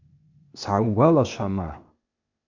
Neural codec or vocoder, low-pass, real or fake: codec, 16 kHz, 0.8 kbps, ZipCodec; 7.2 kHz; fake